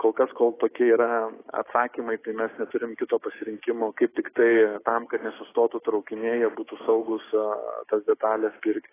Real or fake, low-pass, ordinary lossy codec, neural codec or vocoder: fake; 3.6 kHz; AAC, 16 kbps; codec, 16 kHz, 6 kbps, DAC